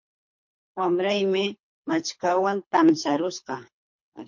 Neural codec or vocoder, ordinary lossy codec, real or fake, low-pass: codec, 24 kHz, 3 kbps, HILCodec; MP3, 48 kbps; fake; 7.2 kHz